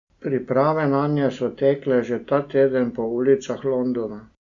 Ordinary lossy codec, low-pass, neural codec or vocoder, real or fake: Opus, 64 kbps; 7.2 kHz; none; real